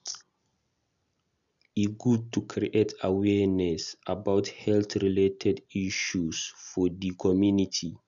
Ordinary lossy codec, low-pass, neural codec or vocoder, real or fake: none; 7.2 kHz; none; real